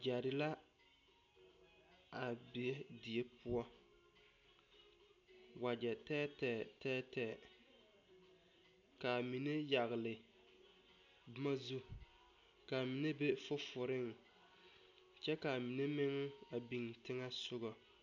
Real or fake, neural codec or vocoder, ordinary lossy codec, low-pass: real; none; MP3, 64 kbps; 7.2 kHz